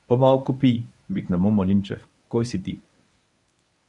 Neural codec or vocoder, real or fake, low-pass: codec, 24 kHz, 0.9 kbps, WavTokenizer, medium speech release version 1; fake; 10.8 kHz